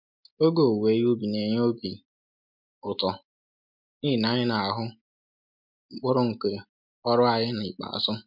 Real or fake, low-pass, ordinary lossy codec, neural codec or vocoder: real; 5.4 kHz; MP3, 48 kbps; none